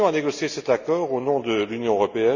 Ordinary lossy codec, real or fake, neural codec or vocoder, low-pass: none; real; none; 7.2 kHz